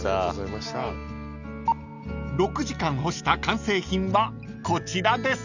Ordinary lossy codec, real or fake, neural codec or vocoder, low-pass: none; real; none; 7.2 kHz